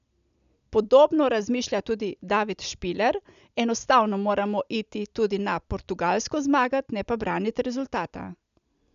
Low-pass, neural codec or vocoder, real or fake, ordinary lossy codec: 7.2 kHz; none; real; none